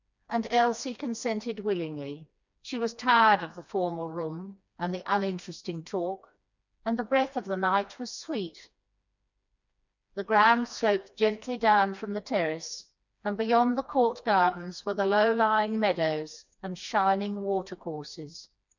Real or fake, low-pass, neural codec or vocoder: fake; 7.2 kHz; codec, 16 kHz, 2 kbps, FreqCodec, smaller model